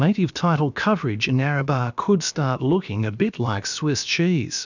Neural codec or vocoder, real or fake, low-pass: codec, 16 kHz, about 1 kbps, DyCAST, with the encoder's durations; fake; 7.2 kHz